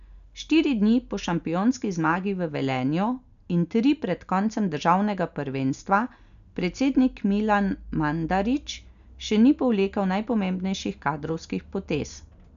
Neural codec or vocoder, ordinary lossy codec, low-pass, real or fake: none; none; 7.2 kHz; real